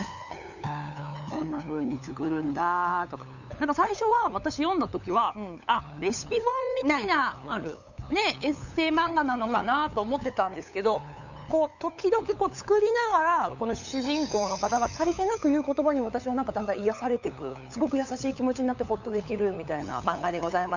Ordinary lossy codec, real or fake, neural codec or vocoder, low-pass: none; fake; codec, 16 kHz, 8 kbps, FunCodec, trained on LibriTTS, 25 frames a second; 7.2 kHz